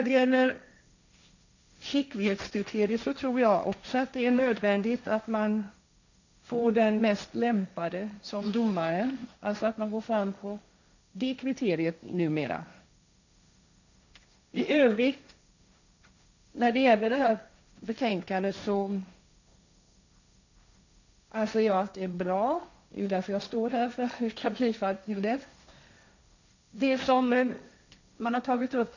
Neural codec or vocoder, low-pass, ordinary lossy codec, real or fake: codec, 16 kHz, 1.1 kbps, Voila-Tokenizer; 7.2 kHz; none; fake